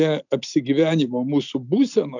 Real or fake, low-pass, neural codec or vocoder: real; 7.2 kHz; none